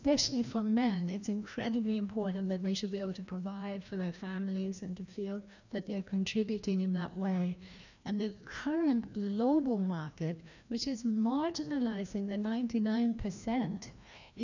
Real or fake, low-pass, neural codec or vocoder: fake; 7.2 kHz; codec, 16 kHz, 1 kbps, FreqCodec, larger model